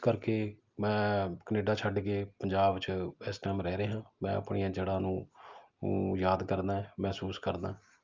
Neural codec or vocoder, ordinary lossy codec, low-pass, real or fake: none; Opus, 24 kbps; 7.2 kHz; real